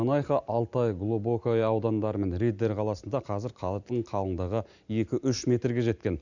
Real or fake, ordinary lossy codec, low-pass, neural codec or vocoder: real; none; 7.2 kHz; none